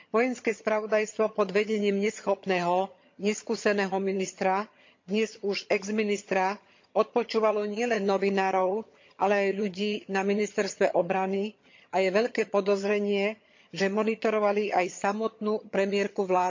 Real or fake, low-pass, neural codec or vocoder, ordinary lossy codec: fake; 7.2 kHz; vocoder, 22.05 kHz, 80 mel bands, HiFi-GAN; MP3, 48 kbps